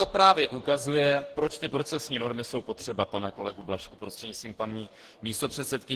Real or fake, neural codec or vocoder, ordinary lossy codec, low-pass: fake; codec, 44.1 kHz, 2.6 kbps, DAC; Opus, 16 kbps; 14.4 kHz